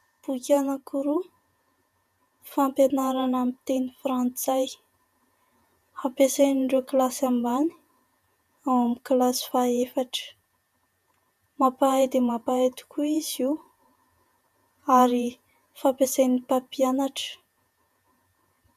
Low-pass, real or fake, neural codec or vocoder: 14.4 kHz; fake; vocoder, 48 kHz, 128 mel bands, Vocos